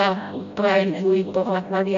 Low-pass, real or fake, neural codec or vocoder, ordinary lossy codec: 7.2 kHz; fake; codec, 16 kHz, 0.5 kbps, FreqCodec, smaller model; MP3, 64 kbps